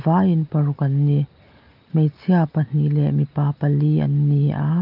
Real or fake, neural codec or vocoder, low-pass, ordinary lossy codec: real; none; 5.4 kHz; Opus, 32 kbps